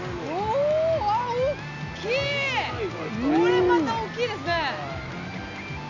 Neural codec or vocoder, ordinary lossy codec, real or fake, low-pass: none; none; real; 7.2 kHz